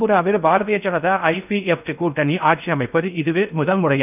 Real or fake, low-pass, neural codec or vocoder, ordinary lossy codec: fake; 3.6 kHz; codec, 16 kHz in and 24 kHz out, 0.6 kbps, FocalCodec, streaming, 4096 codes; none